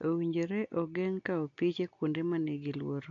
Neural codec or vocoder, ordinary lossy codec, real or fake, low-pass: none; AAC, 48 kbps; real; 7.2 kHz